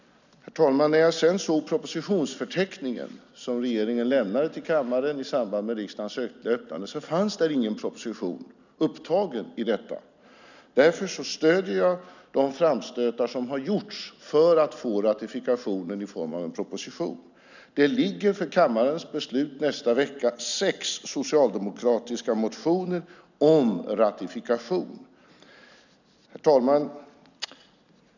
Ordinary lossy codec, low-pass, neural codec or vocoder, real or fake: none; 7.2 kHz; none; real